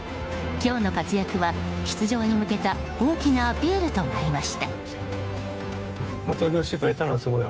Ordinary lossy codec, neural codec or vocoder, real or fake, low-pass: none; codec, 16 kHz, 2 kbps, FunCodec, trained on Chinese and English, 25 frames a second; fake; none